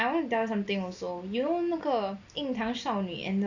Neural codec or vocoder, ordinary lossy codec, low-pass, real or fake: none; none; 7.2 kHz; real